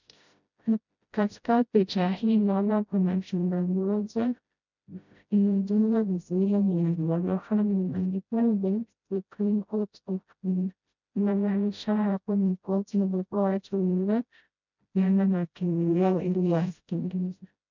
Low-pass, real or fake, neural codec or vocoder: 7.2 kHz; fake; codec, 16 kHz, 0.5 kbps, FreqCodec, smaller model